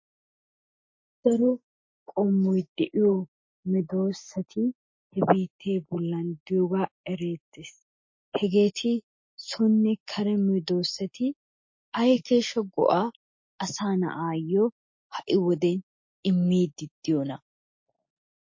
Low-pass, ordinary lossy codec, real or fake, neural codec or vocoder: 7.2 kHz; MP3, 32 kbps; real; none